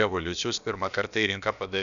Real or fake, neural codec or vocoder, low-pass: fake; codec, 16 kHz, about 1 kbps, DyCAST, with the encoder's durations; 7.2 kHz